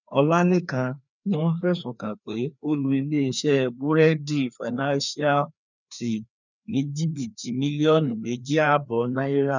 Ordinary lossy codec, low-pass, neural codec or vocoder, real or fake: none; 7.2 kHz; codec, 16 kHz, 2 kbps, FreqCodec, larger model; fake